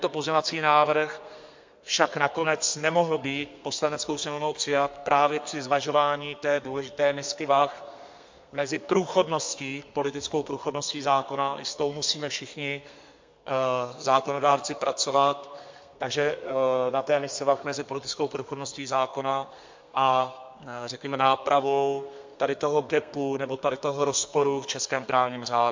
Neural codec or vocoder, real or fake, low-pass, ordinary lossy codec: codec, 32 kHz, 1.9 kbps, SNAC; fake; 7.2 kHz; MP3, 48 kbps